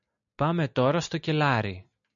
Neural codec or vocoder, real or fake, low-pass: none; real; 7.2 kHz